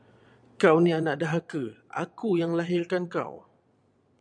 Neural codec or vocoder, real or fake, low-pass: vocoder, 22.05 kHz, 80 mel bands, Vocos; fake; 9.9 kHz